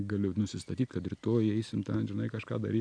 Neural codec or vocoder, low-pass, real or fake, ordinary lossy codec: none; 9.9 kHz; real; AAC, 64 kbps